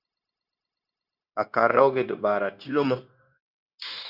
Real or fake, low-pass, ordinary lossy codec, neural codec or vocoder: fake; 5.4 kHz; Opus, 64 kbps; codec, 16 kHz, 0.9 kbps, LongCat-Audio-Codec